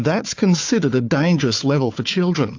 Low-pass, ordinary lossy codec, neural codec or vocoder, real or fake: 7.2 kHz; AAC, 48 kbps; codec, 16 kHz, 4 kbps, FunCodec, trained on Chinese and English, 50 frames a second; fake